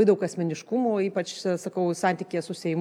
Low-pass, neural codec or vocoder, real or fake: 19.8 kHz; none; real